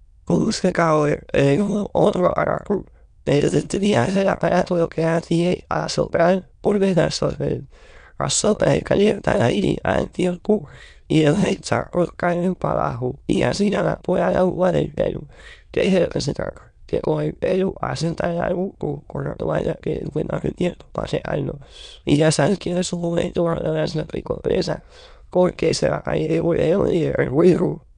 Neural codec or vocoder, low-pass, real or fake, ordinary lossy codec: autoencoder, 22.05 kHz, a latent of 192 numbers a frame, VITS, trained on many speakers; 9.9 kHz; fake; none